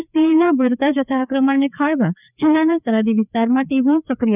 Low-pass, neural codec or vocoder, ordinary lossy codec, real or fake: 3.6 kHz; codec, 16 kHz, 2 kbps, FreqCodec, larger model; none; fake